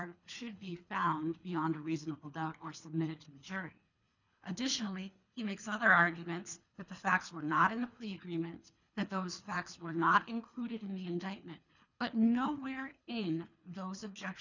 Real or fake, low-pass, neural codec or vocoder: fake; 7.2 kHz; codec, 24 kHz, 3 kbps, HILCodec